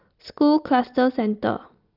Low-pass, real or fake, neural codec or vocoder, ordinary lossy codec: 5.4 kHz; real; none; Opus, 24 kbps